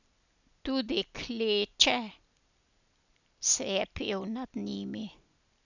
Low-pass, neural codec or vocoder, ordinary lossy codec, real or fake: 7.2 kHz; none; none; real